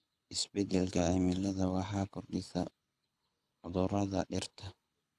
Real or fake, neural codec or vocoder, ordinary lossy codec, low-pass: fake; codec, 24 kHz, 6 kbps, HILCodec; none; none